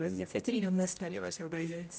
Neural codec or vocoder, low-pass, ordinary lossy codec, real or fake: codec, 16 kHz, 0.5 kbps, X-Codec, HuBERT features, trained on general audio; none; none; fake